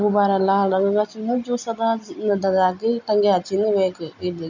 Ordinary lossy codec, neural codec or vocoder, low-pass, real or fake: none; none; 7.2 kHz; real